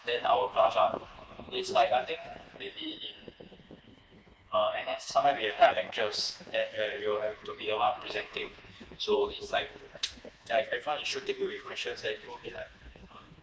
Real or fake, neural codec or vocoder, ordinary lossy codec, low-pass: fake; codec, 16 kHz, 2 kbps, FreqCodec, smaller model; none; none